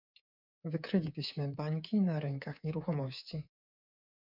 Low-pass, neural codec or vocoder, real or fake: 5.4 kHz; codec, 24 kHz, 3.1 kbps, DualCodec; fake